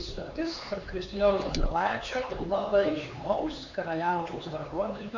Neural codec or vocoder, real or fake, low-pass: codec, 16 kHz, 4 kbps, X-Codec, HuBERT features, trained on LibriSpeech; fake; 7.2 kHz